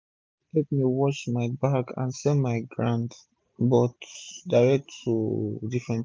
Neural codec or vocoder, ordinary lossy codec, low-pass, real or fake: none; none; none; real